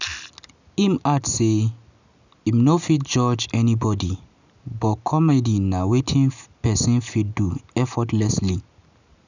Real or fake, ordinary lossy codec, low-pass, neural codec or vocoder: real; none; 7.2 kHz; none